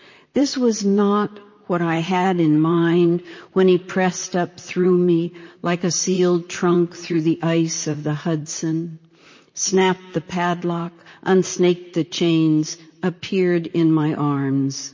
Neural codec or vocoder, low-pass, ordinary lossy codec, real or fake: vocoder, 44.1 kHz, 128 mel bands, Pupu-Vocoder; 7.2 kHz; MP3, 32 kbps; fake